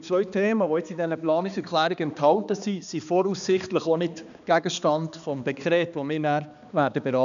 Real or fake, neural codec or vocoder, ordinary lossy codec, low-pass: fake; codec, 16 kHz, 2 kbps, X-Codec, HuBERT features, trained on balanced general audio; none; 7.2 kHz